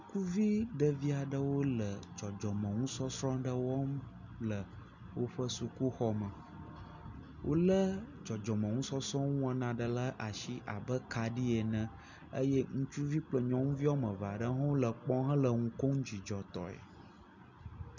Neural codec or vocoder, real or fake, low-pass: none; real; 7.2 kHz